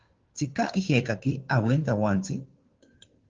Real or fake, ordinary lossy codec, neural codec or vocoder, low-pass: fake; Opus, 16 kbps; codec, 16 kHz, 2 kbps, FunCodec, trained on Chinese and English, 25 frames a second; 7.2 kHz